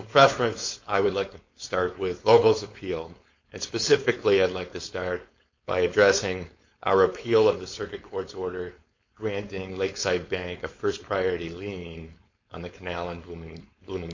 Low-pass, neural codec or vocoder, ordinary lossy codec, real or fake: 7.2 kHz; codec, 16 kHz, 4.8 kbps, FACodec; MP3, 48 kbps; fake